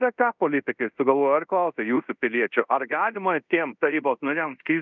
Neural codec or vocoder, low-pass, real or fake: codec, 24 kHz, 0.5 kbps, DualCodec; 7.2 kHz; fake